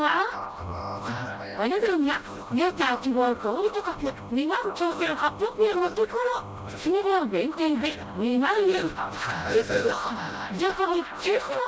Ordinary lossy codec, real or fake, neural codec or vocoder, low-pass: none; fake; codec, 16 kHz, 0.5 kbps, FreqCodec, smaller model; none